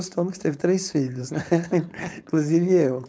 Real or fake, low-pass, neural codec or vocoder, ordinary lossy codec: fake; none; codec, 16 kHz, 4.8 kbps, FACodec; none